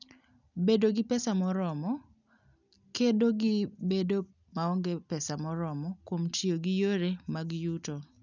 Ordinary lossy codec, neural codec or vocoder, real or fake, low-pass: none; none; real; 7.2 kHz